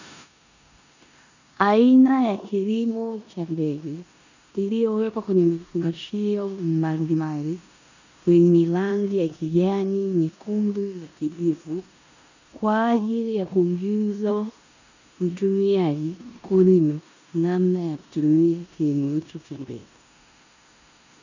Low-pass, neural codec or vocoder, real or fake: 7.2 kHz; codec, 16 kHz in and 24 kHz out, 0.9 kbps, LongCat-Audio-Codec, four codebook decoder; fake